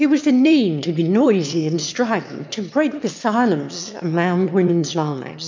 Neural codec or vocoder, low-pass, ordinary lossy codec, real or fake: autoencoder, 22.05 kHz, a latent of 192 numbers a frame, VITS, trained on one speaker; 7.2 kHz; MP3, 64 kbps; fake